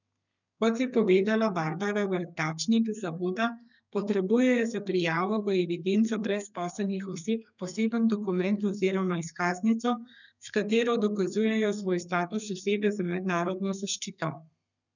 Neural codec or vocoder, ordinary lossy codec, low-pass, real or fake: codec, 32 kHz, 1.9 kbps, SNAC; none; 7.2 kHz; fake